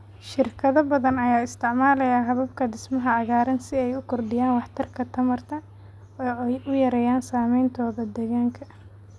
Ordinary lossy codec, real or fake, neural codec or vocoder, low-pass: none; real; none; none